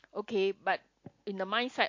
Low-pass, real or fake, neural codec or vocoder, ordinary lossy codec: 7.2 kHz; real; none; MP3, 48 kbps